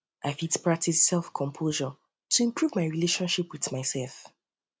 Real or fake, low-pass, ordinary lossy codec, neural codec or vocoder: real; none; none; none